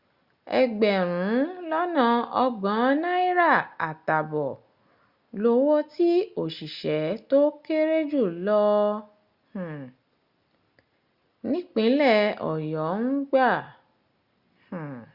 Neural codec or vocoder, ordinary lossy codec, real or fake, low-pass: none; Opus, 64 kbps; real; 5.4 kHz